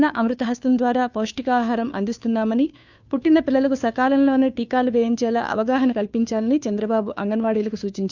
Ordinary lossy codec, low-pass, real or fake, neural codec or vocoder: none; 7.2 kHz; fake; codec, 16 kHz, 2 kbps, FunCodec, trained on Chinese and English, 25 frames a second